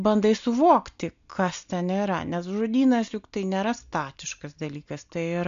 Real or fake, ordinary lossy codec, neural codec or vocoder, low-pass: real; MP3, 64 kbps; none; 7.2 kHz